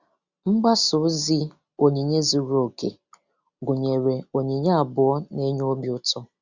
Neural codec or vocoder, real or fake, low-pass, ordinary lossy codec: none; real; 7.2 kHz; Opus, 64 kbps